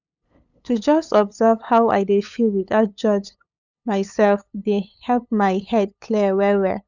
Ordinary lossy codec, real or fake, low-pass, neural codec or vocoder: none; fake; 7.2 kHz; codec, 16 kHz, 8 kbps, FunCodec, trained on LibriTTS, 25 frames a second